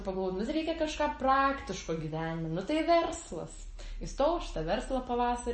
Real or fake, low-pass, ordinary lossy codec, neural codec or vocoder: real; 10.8 kHz; MP3, 32 kbps; none